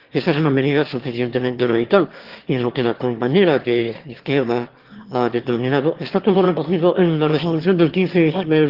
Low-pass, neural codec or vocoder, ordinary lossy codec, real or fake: 5.4 kHz; autoencoder, 22.05 kHz, a latent of 192 numbers a frame, VITS, trained on one speaker; Opus, 16 kbps; fake